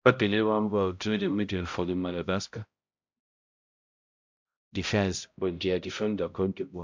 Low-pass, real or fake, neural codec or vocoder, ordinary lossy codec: 7.2 kHz; fake; codec, 16 kHz, 0.5 kbps, X-Codec, HuBERT features, trained on balanced general audio; MP3, 64 kbps